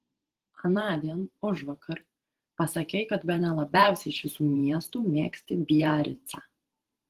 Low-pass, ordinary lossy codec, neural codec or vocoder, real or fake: 14.4 kHz; Opus, 16 kbps; vocoder, 44.1 kHz, 128 mel bands every 512 samples, BigVGAN v2; fake